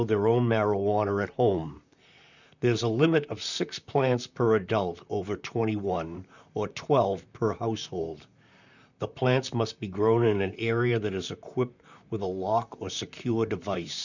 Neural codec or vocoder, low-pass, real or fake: vocoder, 44.1 kHz, 128 mel bands, Pupu-Vocoder; 7.2 kHz; fake